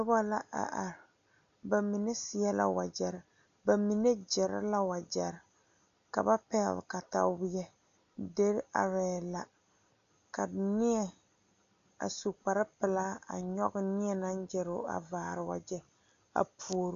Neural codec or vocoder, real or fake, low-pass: none; real; 7.2 kHz